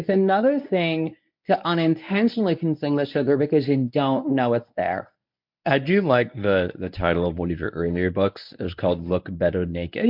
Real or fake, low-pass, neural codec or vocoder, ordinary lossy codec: fake; 5.4 kHz; codec, 24 kHz, 0.9 kbps, WavTokenizer, medium speech release version 1; MP3, 48 kbps